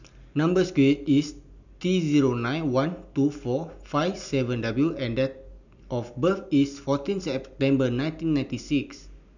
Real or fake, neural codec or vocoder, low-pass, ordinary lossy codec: real; none; 7.2 kHz; none